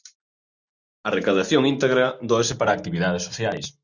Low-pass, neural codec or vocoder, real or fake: 7.2 kHz; none; real